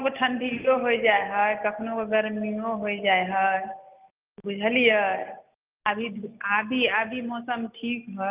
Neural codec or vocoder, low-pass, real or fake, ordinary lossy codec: none; 3.6 kHz; real; Opus, 32 kbps